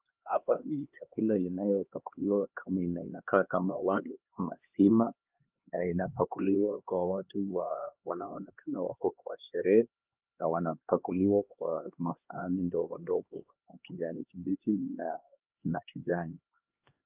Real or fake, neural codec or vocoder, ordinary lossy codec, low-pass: fake; codec, 16 kHz, 2 kbps, X-Codec, HuBERT features, trained on LibriSpeech; Opus, 32 kbps; 3.6 kHz